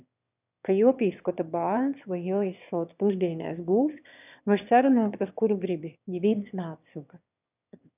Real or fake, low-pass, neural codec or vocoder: fake; 3.6 kHz; autoencoder, 22.05 kHz, a latent of 192 numbers a frame, VITS, trained on one speaker